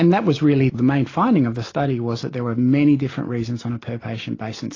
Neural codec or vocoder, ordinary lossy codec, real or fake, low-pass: none; AAC, 32 kbps; real; 7.2 kHz